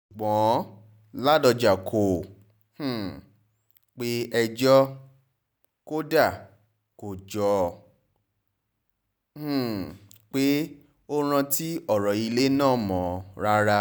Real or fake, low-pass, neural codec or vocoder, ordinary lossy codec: real; none; none; none